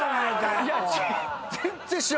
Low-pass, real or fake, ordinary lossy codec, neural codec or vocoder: none; real; none; none